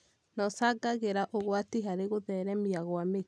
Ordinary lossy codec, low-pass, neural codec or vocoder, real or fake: none; none; none; real